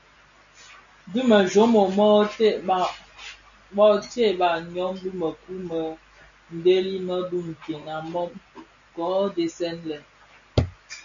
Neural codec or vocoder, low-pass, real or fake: none; 7.2 kHz; real